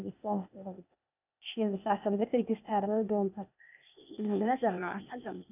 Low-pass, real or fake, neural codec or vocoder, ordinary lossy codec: 3.6 kHz; fake; codec, 16 kHz, 0.8 kbps, ZipCodec; none